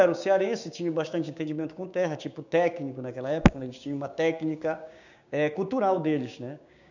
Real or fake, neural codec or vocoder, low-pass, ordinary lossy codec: fake; codec, 16 kHz, 6 kbps, DAC; 7.2 kHz; none